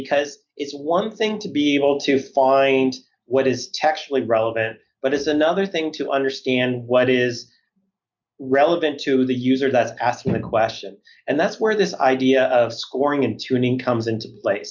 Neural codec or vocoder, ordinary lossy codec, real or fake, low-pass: none; MP3, 64 kbps; real; 7.2 kHz